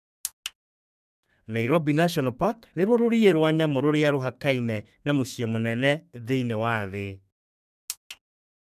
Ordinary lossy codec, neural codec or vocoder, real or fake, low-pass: none; codec, 32 kHz, 1.9 kbps, SNAC; fake; 14.4 kHz